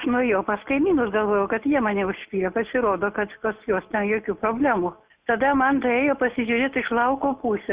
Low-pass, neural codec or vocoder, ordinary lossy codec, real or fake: 3.6 kHz; none; Opus, 64 kbps; real